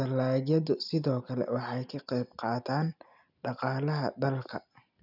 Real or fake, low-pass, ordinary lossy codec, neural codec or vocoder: real; 5.4 kHz; none; none